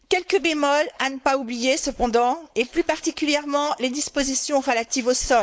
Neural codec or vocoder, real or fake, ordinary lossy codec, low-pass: codec, 16 kHz, 4.8 kbps, FACodec; fake; none; none